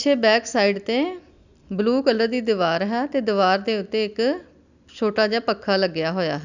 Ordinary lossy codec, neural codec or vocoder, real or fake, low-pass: none; none; real; 7.2 kHz